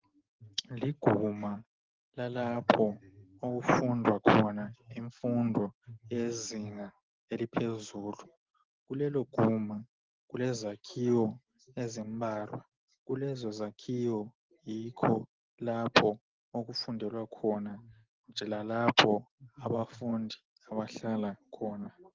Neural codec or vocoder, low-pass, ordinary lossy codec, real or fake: none; 7.2 kHz; Opus, 16 kbps; real